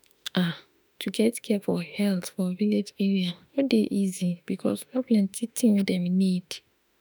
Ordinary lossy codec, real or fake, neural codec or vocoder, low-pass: none; fake; autoencoder, 48 kHz, 32 numbers a frame, DAC-VAE, trained on Japanese speech; none